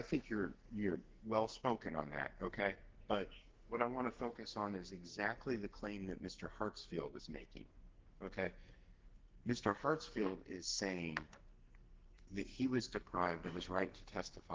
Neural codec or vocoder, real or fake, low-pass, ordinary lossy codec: codec, 44.1 kHz, 2.6 kbps, SNAC; fake; 7.2 kHz; Opus, 16 kbps